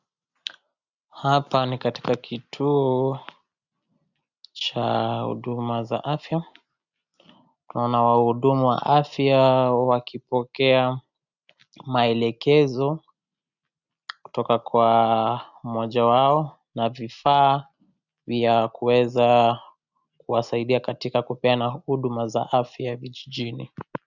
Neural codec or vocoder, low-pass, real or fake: none; 7.2 kHz; real